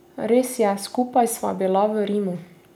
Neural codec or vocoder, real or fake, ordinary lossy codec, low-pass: none; real; none; none